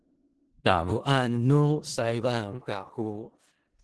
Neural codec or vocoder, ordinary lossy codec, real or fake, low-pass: codec, 16 kHz in and 24 kHz out, 0.4 kbps, LongCat-Audio-Codec, four codebook decoder; Opus, 16 kbps; fake; 10.8 kHz